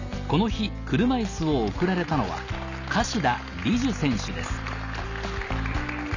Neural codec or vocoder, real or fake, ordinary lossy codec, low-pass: none; real; none; 7.2 kHz